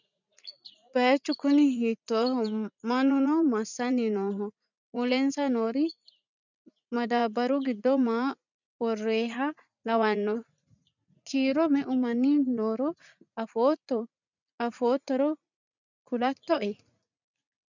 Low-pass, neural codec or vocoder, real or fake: 7.2 kHz; vocoder, 44.1 kHz, 80 mel bands, Vocos; fake